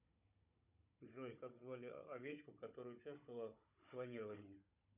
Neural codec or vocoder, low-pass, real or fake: codec, 16 kHz, 16 kbps, FunCodec, trained on Chinese and English, 50 frames a second; 3.6 kHz; fake